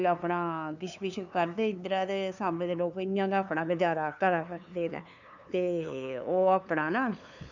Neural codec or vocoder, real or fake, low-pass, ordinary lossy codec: codec, 16 kHz, 2 kbps, FunCodec, trained on LibriTTS, 25 frames a second; fake; 7.2 kHz; none